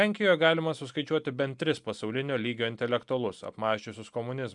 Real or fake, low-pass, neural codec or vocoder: real; 10.8 kHz; none